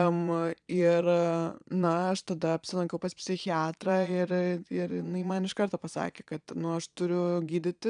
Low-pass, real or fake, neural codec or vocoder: 9.9 kHz; fake; vocoder, 22.05 kHz, 80 mel bands, Vocos